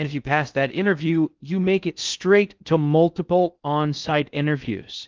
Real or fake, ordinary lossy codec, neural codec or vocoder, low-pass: fake; Opus, 24 kbps; codec, 16 kHz in and 24 kHz out, 0.6 kbps, FocalCodec, streaming, 2048 codes; 7.2 kHz